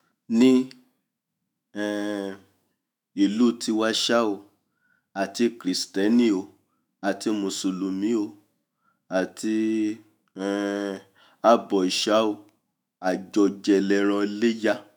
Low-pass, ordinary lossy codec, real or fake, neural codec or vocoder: none; none; fake; autoencoder, 48 kHz, 128 numbers a frame, DAC-VAE, trained on Japanese speech